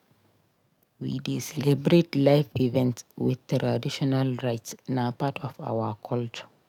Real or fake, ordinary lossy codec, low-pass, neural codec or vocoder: fake; none; 19.8 kHz; codec, 44.1 kHz, 7.8 kbps, DAC